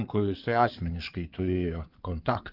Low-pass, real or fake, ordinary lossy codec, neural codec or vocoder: 5.4 kHz; fake; Opus, 32 kbps; codec, 16 kHz in and 24 kHz out, 2.2 kbps, FireRedTTS-2 codec